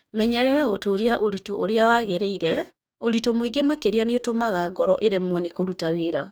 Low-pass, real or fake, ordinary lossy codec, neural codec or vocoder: none; fake; none; codec, 44.1 kHz, 2.6 kbps, DAC